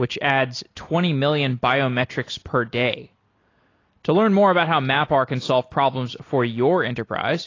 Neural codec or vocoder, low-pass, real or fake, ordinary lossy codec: none; 7.2 kHz; real; AAC, 32 kbps